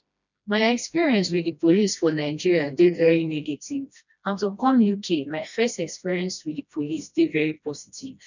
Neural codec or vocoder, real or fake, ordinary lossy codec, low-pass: codec, 16 kHz, 1 kbps, FreqCodec, smaller model; fake; none; 7.2 kHz